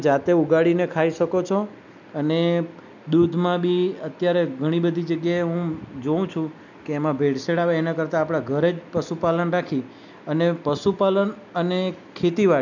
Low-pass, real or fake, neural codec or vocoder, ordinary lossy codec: 7.2 kHz; real; none; none